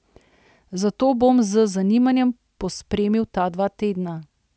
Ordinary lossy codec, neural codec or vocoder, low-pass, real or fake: none; none; none; real